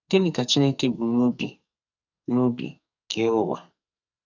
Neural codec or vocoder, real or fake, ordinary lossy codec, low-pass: codec, 44.1 kHz, 2.6 kbps, SNAC; fake; none; 7.2 kHz